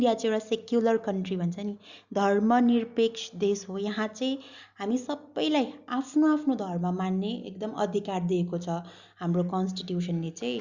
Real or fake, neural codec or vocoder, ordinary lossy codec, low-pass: real; none; Opus, 64 kbps; 7.2 kHz